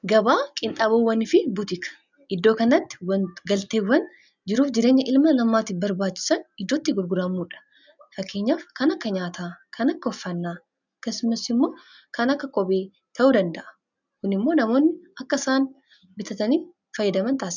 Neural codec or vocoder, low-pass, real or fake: none; 7.2 kHz; real